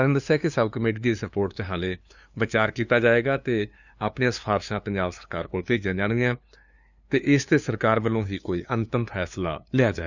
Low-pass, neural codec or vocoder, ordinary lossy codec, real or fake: 7.2 kHz; codec, 16 kHz, 2 kbps, FunCodec, trained on LibriTTS, 25 frames a second; none; fake